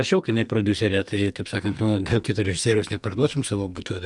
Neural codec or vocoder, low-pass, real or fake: codec, 44.1 kHz, 2.6 kbps, SNAC; 10.8 kHz; fake